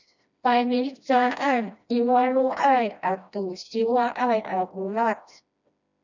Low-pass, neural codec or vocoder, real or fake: 7.2 kHz; codec, 16 kHz, 1 kbps, FreqCodec, smaller model; fake